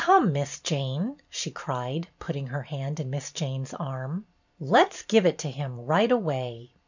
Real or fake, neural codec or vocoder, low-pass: fake; vocoder, 44.1 kHz, 128 mel bands every 512 samples, BigVGAN v2; 7.2 kHz